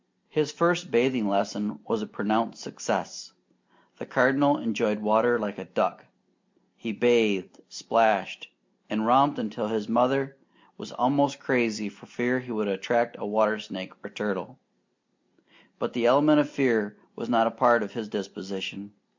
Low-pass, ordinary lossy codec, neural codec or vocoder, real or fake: 7.2 kHz; MP3, 64 kbps; none; real